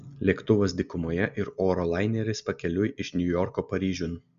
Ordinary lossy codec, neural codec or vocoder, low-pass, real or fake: MP3, 96 kbps; none; 7.2 kHz; real